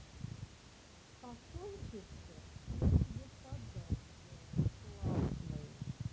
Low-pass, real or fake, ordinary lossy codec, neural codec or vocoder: none; real; none; none